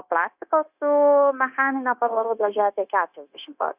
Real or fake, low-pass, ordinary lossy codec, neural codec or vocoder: fake; 3.6 kHz; Opus, 64 kbps; codec, 16 kHz, 0.9 kbps, LongCat-Audio-Codec